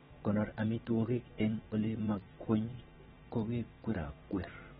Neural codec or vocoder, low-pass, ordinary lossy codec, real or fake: vocoder, 22.05 kHz, 80 mel bands, WaveNeXt; 9.9 kHz; AAC, 16 kbps; fake